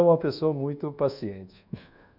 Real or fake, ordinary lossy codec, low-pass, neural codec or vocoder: fake; none; 5.4 kHz; codec, 24 kHz, 1.2 kbps, DualCodec